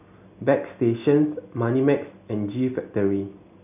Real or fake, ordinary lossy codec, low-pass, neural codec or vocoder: real; none; 3.6 kHz; none